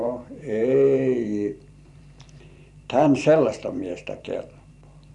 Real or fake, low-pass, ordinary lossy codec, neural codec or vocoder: fake; 10.8 kHz; none; vocoder, 44.1 kHz, 128 mel bands every 512 samples, BigVGAN v2